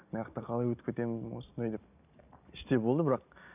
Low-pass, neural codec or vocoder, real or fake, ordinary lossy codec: 3.6 kHz; none; real; none